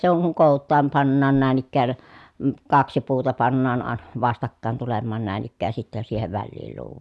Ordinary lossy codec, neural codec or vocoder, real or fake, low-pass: none; none; real; none